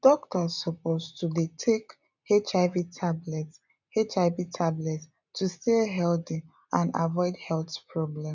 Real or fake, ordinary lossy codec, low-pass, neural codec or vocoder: real; none; 7.2 kHz; none